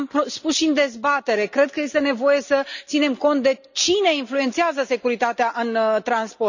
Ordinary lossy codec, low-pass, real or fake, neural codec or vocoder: none; 7.2 kHz; real; none